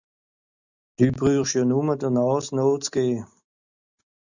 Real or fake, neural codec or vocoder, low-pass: real; none; 7.2 kHz